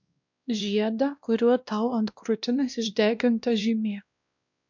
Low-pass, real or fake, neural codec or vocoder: 7.2 kHz; fake; codec, 16 kHz, 1 kbps, X-Codec, WavLM features, trained on Multilingual LibriSpeech